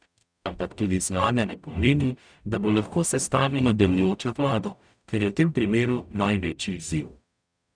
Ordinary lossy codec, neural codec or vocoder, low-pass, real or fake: none; codec, 44.1 kHz, 0.9 kbps, DAC; 9.9 kHz; fake